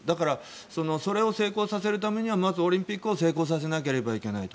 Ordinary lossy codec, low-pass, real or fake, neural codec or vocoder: none; none; real; none